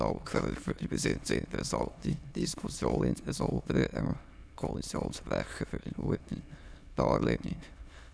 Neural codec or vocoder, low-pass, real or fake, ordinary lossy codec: autoencoder, 22.05 kHz, a latent of 192 numbers a frame, VITS, trained on many speakers; none; fake; none